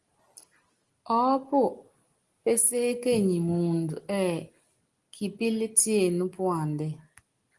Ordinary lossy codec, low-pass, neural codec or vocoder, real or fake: Opus, 24 kbps; 10.8 kHz; none; real